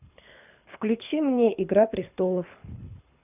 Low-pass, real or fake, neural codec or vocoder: 3.6 kHz; fake; codec, 24 kHz, 6 kbps, HILCodec